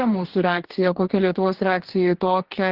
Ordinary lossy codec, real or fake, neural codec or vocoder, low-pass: Opus, 16 kbps; fake; codec, 44.1 kHz, 2.6 kbps, DAC; 5.4 kHz